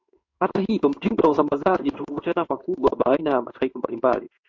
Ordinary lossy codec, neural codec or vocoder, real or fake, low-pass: MP3, 48 kbps; codec, 16 kHz in and 24 kHz out, 1 kbps, XY-Tokenizer; fake; 7.2 kHz